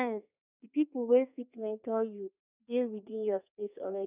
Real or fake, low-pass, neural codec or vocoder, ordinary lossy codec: fake; 3.6 kHz; codec, 32 kHz, 1.9 kbps, SNAC; none